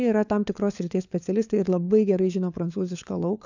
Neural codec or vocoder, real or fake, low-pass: codec, 16 kHz, 4 kbps, FunCodec, trained on LibriTTS, 50 frames a second; fake; 7.2 kHz